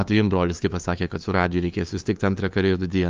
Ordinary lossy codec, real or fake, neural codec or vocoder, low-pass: Opus, 24 kbps; fake; codec, 16 kHz, 2 kbps, FunCodec, trained on LibriTTS, 25 frames a second; 7.2 kHz